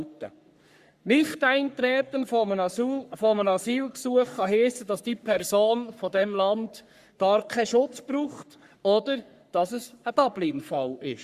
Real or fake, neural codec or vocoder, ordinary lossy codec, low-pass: fake; codec, 44.1 kHz, 3.4 kbps, Pupu-Codec; Opus, 64 kbps; 14.4 kHz